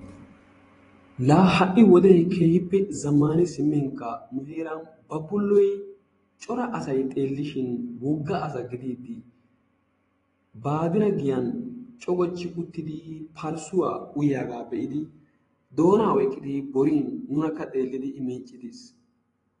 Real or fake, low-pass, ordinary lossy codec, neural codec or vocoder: fake; 10.8 kHz; AAC, 32 kbps; vocoder, 24 kHz, 100 mel bands, Vocos